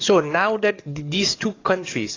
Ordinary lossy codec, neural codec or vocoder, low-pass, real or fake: AAC, 32 kbps; codec, 44.1 kHz, 7.8 kbps, DAC; 7.2 kHz; fake